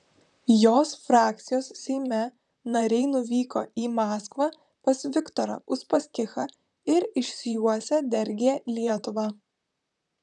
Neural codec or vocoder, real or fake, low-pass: vocoder, 44.1 kHz, 128 mel bands, Pupu-Vocoder; fake; 10.8 kHz